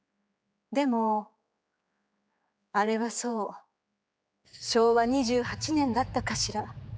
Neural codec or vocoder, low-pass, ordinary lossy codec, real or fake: codec, 16 kHz, 4 kbps, X-Codec, HuBERT features, trained on general audio; none; none; fake